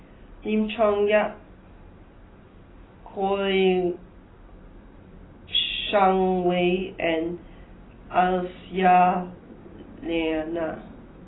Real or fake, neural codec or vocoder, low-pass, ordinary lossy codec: real; none; 7.2 kHz; AAC, 16 kbps